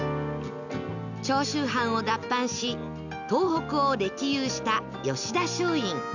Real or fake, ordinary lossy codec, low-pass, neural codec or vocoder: real; none; 7.2 kHz; none